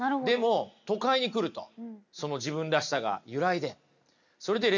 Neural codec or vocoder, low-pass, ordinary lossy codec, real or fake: none; 7.2 kHz; none; real